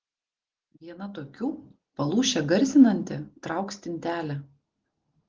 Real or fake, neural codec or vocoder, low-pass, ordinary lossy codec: real; none; 7.2 kHz; Opus, 16 kbps